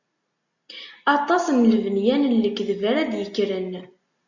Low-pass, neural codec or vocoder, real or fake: 7.2 kHz; none; real